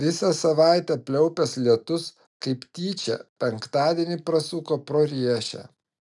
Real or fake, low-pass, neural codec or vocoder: real; 10.8 kHz; none